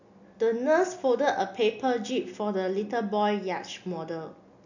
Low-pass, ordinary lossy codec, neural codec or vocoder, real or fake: 7.2 kHz; none; none; real